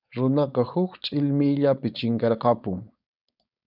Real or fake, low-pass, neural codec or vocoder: fake; 5.4 kHz; codec, 16 kHz, 4.8 kbps, FACodec